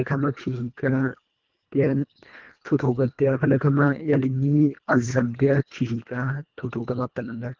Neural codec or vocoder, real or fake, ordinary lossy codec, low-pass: codec, 24 kHz, 1.5 kbps, HILCodec; fake; Opus, 32 kbps; 7.2 kHz